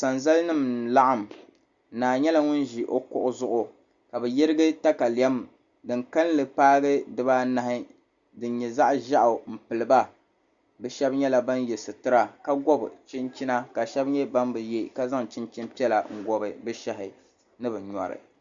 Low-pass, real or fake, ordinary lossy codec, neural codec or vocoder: 7.2 kHz; real; Opus, 64 kbps; none